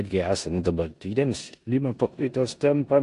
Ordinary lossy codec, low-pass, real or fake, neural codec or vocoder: AAC, 48 kbps; 10.8 kHz; fake; codec, 16 kHz in and 24 kHz out, 0.9 kbps, LongCat-Audio-Codec, four codebook decoder